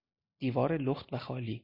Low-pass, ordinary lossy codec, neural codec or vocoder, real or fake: 5.4 kHz; MP3, 32 kbps; none; real